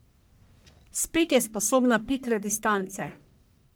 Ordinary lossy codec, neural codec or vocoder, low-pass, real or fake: none; codec, 44.1 kHz, 1.7 kbps, Pupu-Codec; none; fake